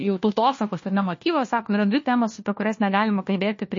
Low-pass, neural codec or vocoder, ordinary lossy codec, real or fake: 7.2 kHz; codec, 16 kHz, 1 kbps, FunCodec, trained on LibriTTS, 50 frames a second; MP3, 32 kbps; fake